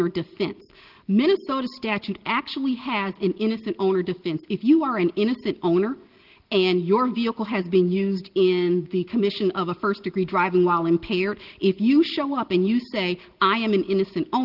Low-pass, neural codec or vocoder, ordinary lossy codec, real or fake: 5.4 kHz; none; Opus, 16 kbps; real